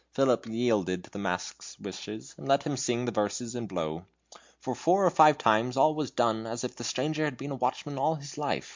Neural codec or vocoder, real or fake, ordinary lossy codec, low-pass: none; real; MP3, 64 kbps; 7.2 kHz